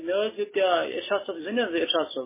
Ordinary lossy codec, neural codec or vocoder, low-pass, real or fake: MP3, 16 kbps; none; 3.6 kHz; real